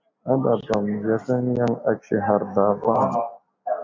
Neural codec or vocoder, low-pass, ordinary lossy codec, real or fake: none; 7.2 kHz; MP3, 64 kbps; real